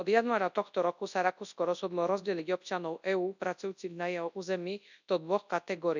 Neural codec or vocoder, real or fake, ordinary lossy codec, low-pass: codec, 24 kHz, 0.9 kbps, WavTokenizer, large speech release; fake; none; 7.2 kHz